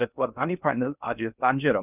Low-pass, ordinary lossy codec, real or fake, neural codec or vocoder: 3.6 kHz; none; fake; codec, 16 kHz in and 24 kHz out, 0.6 kbps, FocalCodec, streaming, 2048 codes